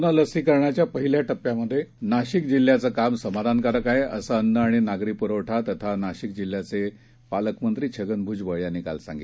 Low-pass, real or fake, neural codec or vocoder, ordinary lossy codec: none; real; none; none